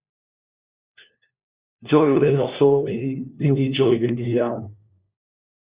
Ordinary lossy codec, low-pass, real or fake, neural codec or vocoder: Opus, 64 kbps; 3.6 kHz; fake; codec, 16 kHz, 1 kbps, FunCodec, trained on LibriTTS, 50 frames a second